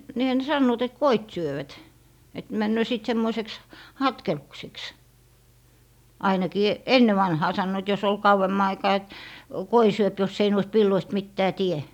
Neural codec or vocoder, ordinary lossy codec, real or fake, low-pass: none; none; real; 19.8 kHz